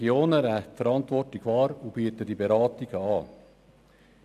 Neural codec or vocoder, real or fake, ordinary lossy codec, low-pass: none; real; none; 14.4 kHz